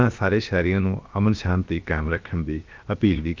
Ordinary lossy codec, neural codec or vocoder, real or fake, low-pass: Opus, 24 kbps; codec, 16 kHz, 0.7 kbps, FocalCodec; fake; 7.2 kHz